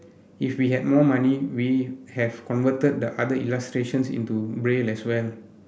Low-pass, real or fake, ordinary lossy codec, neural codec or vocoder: none; real; none; none